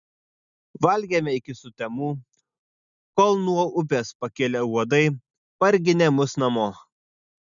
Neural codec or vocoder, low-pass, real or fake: none; 7.2 kHz; real